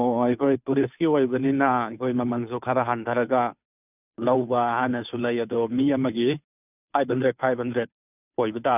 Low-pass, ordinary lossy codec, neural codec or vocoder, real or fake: 3.6 kHz; none; codec, 16 kHz, 2 kbps, FunCodec, trained on Chinese and English, 25 frames a second; fake